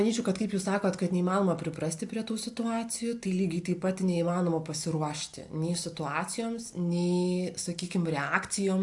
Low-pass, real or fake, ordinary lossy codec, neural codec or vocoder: 10.8 kHz; real; AAC, 64 kbps; none